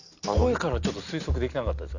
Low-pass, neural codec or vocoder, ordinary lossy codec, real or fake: 7.2 kHz; none; none; real